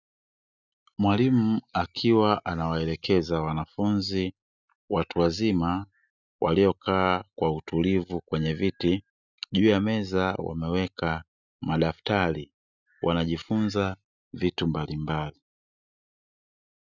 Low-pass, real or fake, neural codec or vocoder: 7.2 kHz; real; none